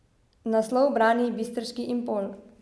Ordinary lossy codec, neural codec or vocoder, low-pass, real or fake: none; none; none; real